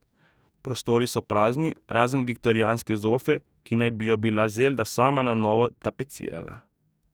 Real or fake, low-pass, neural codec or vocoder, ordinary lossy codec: fake; none; codec, 44.1 kHz, 2.6 kbps, DAC; none